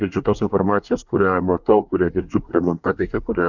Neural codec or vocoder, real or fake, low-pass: codec, 24 kHz, 1 kbps, SNAC; fake; 7.2 kHz